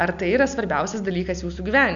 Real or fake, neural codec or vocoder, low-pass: real; none; 7.2 kHz